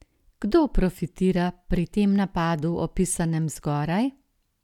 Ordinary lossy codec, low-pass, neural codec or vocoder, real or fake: none; 19.8 kHz; none; real